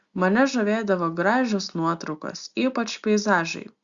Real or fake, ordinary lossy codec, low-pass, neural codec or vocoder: real; Opus, 64 kbps; 7.2 kHz; none